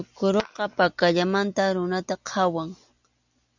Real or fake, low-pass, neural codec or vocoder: real; 7.2 kHz; none